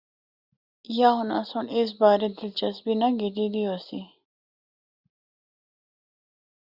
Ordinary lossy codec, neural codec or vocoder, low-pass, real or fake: Opus, 64 kbps; none; 5.4 kHz; real